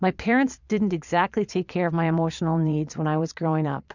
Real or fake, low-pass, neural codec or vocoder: fake; 7.2 kHz; vocoder, 22.05 kHz, 80 mel bands, Vocos